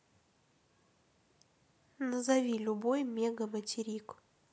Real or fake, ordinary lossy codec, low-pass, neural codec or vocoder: real; none; none; none